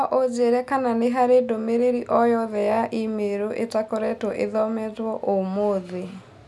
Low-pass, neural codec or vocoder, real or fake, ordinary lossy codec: none; none; real; none